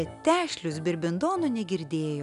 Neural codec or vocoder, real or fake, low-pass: none; real; 10.8 kHz